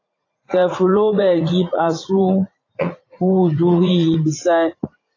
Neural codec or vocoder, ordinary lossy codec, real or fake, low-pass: vocoder, 44.1 kHz, 80 mel bands, Vocos; AAC, 32 kbps; fake; 7.2 kHz